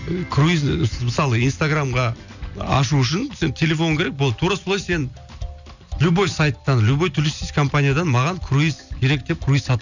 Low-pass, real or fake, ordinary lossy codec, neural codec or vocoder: 7.2 kHz; real; none; none